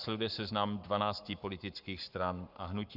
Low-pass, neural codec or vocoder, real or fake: 5.4 kHz; codec, 44.1 kHz, 7.8 kbps, Pupu-Codec; fake